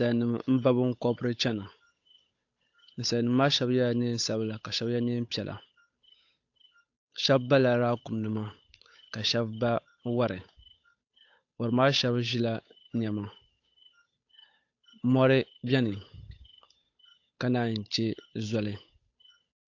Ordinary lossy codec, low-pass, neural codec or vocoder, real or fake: AAC, 48 kbps; 7.2 kHz; codec, 16 kHz, 8 kbps, FunCodec, trained on Chinese and English, 25 frames a second; fake